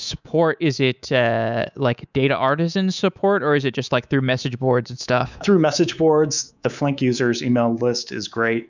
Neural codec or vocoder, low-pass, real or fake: codec, 24 kHz, 3.1 kbps, DualCodec; 7.2 kHz; fake